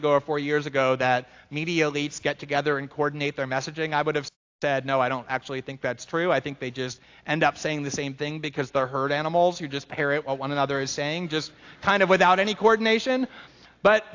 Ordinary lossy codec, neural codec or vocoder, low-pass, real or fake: AAC, 48 kbps; none; 7.2 kHz; real